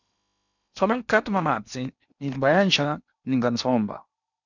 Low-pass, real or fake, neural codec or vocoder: 7.2 kHz; fake; codec, 16 kHz in and 24 kHz out, 0.8 kbps, FocalCodec, streaming, 65536 codes